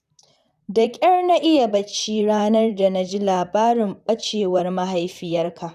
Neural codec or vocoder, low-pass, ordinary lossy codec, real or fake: vocoder, 44.1 kHz, 128 mel bands, Pupu-Vocoder; 14.4 kHz; none; fake